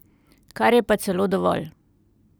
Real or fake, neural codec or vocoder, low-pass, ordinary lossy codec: real; none; none; none